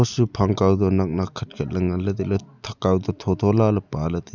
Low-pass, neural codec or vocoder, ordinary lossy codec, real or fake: 7.2 kHz; none; none; real